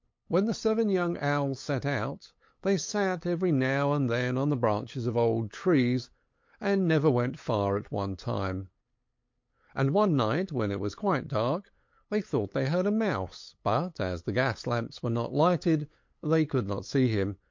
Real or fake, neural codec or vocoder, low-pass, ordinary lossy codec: fake; codec, 16 kHz, 8 kbps, FunCodec, trained on LibriTTS, 25 frames a second; 7.2 kHz; MP3, 48 kbps